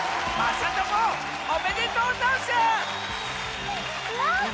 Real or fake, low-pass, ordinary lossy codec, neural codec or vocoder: real; none; none; none